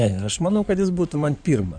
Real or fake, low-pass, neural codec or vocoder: fake; 9.9 kHz; codec, 16 kHz in and 24 kHz out, 2.2 kbps, FireRedTTS-2 codec